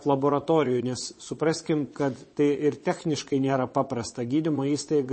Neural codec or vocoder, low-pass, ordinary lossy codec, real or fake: vocoder, 22.05 kHz, 80 mel bands, WaveNeXt; 9.9 kHz; MP3, 32 kbps; fake